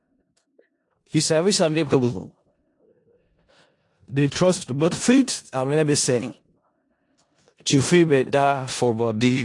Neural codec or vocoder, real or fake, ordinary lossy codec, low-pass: codec, 16 kHz in and 24 kHz out, 0.4 kbps, LongCat-Audio-Codec, four codebook decoder; fake; AAC, 48 kbps; 10.8 kHz